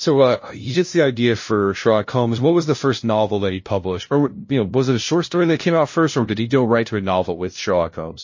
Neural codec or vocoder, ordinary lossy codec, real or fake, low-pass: codec, 16 kHz, 0.5 kbps, FunCodec, trained on LibriTTS, 25 frames a second; MP3, 32 kbps; fake; 7.2 kHz